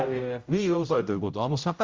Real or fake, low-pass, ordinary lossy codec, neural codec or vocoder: fake; 7.2 kHz; Opus, 32 kbps; codec, 16 kHz, 0.5 kbps, X-Codec, HuBERT features, trained on general audio